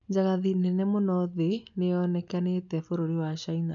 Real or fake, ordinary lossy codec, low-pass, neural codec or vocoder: real; none; 7.2 kHz; none